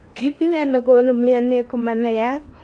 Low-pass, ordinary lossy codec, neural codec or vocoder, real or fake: 9.9 kHz; AAC, 48 kbps; codec, 16 kHz in and 24 kHz out, 0.8 kbps, FocalCodec, streaming, 65536 codes; fake